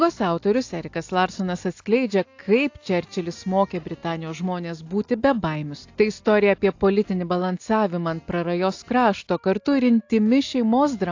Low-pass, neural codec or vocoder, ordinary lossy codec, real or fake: 7.2 kHz; none; AAC, 48 kbps; real